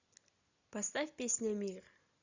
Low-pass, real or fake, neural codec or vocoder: 7.2 kHz; real; none